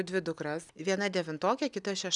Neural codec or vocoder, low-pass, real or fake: none; 10.8 kHz; real